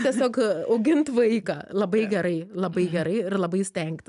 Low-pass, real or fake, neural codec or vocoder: 10.8 kHz; real; none